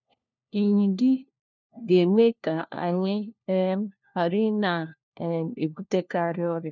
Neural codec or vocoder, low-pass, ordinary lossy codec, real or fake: codec, 16 kHz, 1 kbps, FunCodec, trained on LibriTTS, 50 frames a second; 7.2 kHz; none; fake